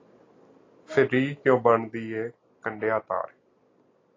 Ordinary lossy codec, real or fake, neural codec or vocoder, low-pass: AAC, 32 kbps; real; none; 7.2 kHz